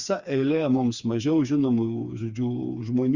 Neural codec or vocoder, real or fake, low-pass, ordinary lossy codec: codec, 16 kHz, 4 kbps, FreqCodec, smaller model; fake; 7.2 kHz; Opus, 64 kbps